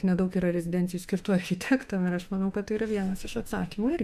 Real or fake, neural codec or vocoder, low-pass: fake; autoencoder, 48 kHz, 32 numbers a frame, DAC-VAE, trained on Japanese speech; 14.4 kHz